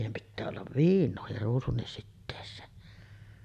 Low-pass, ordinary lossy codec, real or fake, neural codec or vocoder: 14.4 kHz; none; real; none